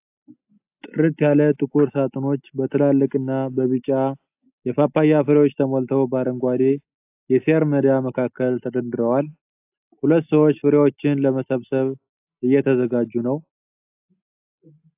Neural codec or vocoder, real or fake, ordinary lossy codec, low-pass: none; real; AAC, 32 kbps; 3.6 kHz